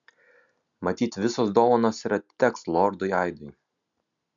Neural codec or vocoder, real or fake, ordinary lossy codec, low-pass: none; real; MP3, 96 kbps; 7.2 kHz